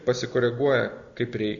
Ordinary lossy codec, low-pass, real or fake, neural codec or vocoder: Opus, 64 kbps; 7.2 kHz; real; none